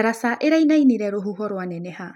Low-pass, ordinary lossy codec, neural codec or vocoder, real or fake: 19.8 kHz; none; none; real